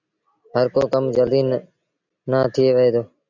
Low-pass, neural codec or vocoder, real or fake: 7.2 kHz; none; real